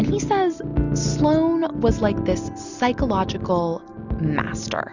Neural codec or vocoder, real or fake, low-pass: none; real; 7.2 kHz